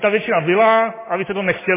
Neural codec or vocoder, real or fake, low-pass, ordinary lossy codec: none; real; 3.6 kHz; MP3, 16 kbps